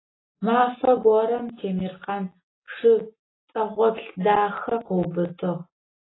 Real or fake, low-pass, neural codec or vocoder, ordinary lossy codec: real; 7.2 kHz; none; AAC, 16 kbps